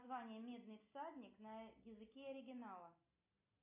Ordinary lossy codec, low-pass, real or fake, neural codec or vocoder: AAC, 32 kbps; 3.6 kHz; real; none